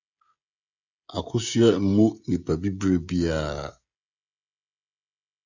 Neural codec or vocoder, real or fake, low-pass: codec, 16 kHz, 16 kbps, FreqCodec, smaller model; fake; 7.2 kHz